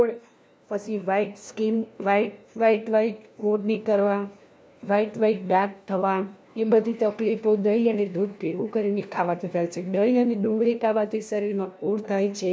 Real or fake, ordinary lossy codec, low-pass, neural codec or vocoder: fake; none; none; codec, 16 kHz, 1 kbps, FunCodec, trained on LibriTTS, 50 frames a second